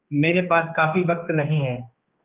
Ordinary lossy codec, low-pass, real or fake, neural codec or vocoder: Opus, 24 kbps; 3.6 kHz; fake; codec, 16 kHz, 2 kbps, X-Codec, HuBERT features, trained on general audio